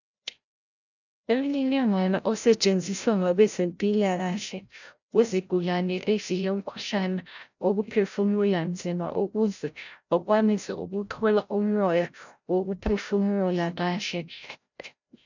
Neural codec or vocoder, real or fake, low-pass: codec, 16 kHz, 0.5 kbps, FreqCodec, larger model; fake; 7.2 kHz